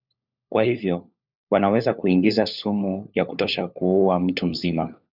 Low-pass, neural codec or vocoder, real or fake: 5.4 kHz; codec, 16 kHz, 4 kbps, FunCodec, trained on LibriTTS, 50 frames a second; fake